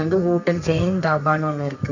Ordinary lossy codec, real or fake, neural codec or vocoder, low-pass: none; fake; codec, 32 kHz, 1.9 kbps, SNAC; 7.2 kHz